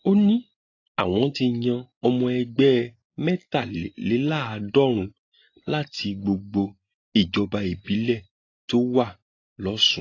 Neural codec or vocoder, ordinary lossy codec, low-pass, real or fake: none; AAC, 32 kbps; 7.2 kHz; real